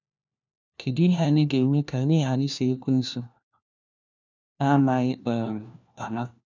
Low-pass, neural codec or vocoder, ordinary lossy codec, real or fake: 7.2 kHz; codec, 16 kHz, 1 kbps, FunCodec, trained on LibriTTS, 50 frames a second; none; fake